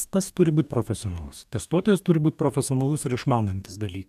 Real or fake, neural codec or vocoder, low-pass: fake; codec, 44.1 kHz, 2.6 kbps, DAC; 14.4 kHz